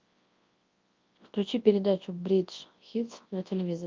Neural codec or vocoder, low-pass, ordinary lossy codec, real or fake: codec, 24 kHz, 0.9 kbps, WavTokenizer, large speech release; 7.2 kHz; Opus, 24 kbps; fake